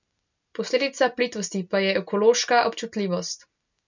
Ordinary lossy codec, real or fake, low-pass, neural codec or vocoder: none; real; 7.2 kHz; none